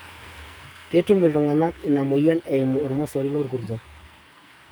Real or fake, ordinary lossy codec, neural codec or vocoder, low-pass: fake; none; codec, 44.1 kHz, 2.6 kbps, SNAC; none